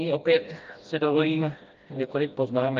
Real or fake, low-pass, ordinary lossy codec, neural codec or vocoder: fake; 7.2 kHz; Opus, 32 kbps; codec, 16 kHz, 1 kbps, FreqCodec, smaller model